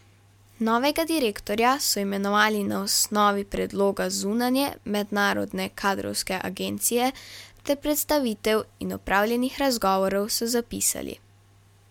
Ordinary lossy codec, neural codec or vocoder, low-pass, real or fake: MP3, 96 kbps; none; 19.8 kHz; real